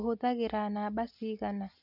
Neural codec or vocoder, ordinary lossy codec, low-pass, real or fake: none; none; 5.4 kHz; real